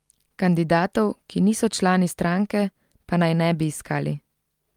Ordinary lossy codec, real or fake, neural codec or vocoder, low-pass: Opus, 32 kbps; real; none; 19.8 kHz